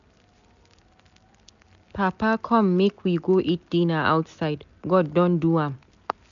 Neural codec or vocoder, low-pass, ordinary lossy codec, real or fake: none; 7.2 kHz; none; real